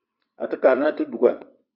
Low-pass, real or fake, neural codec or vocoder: 5.4 kHz; fake; vocoder, 22.05 kHz, 80 mel bands, WaveNeXt